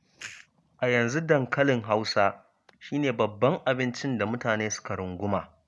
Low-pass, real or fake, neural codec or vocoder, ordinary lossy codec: none; real; none; none